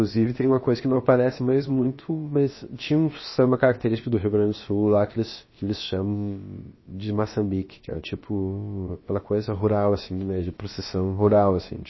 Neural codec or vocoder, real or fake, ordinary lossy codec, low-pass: codec, 16 kHz, about 1 kbps, DyCAST, with the encoder's durations; fake; MP3, 24 kbps; 7.2 kHz